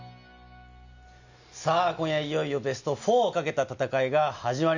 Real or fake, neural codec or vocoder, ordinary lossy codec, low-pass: real; none; none; 7.2 kHz